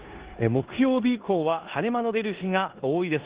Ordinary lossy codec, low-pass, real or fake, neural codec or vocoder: Opus, 16 kbps; 3.6 kHz; fake; codec, 16 kHz in and 24 kHz out, 0.9 kbps, LongCat-Audio-Codec, four codebook decoder